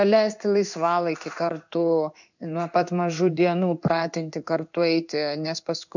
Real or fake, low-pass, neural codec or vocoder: fake; 7.2 kHz; codec, 16 kHz in and 24 kHz out, 1 kbps, XY-Tokenizer